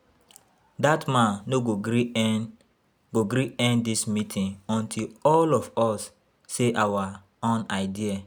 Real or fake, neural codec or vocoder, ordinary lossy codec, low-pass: real; none; none; none